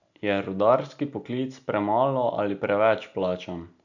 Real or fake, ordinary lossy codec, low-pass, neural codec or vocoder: real; none; 7.2 kHz; none